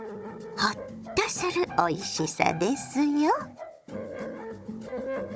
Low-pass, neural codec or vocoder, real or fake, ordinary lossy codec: none; codec, 16 kHz, 16 kbps, FunCodec, trained on Chinese and English, 50 frames a second; fake; none